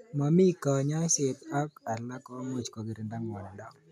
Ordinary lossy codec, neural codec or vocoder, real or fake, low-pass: none; none; real; 14.4 kHz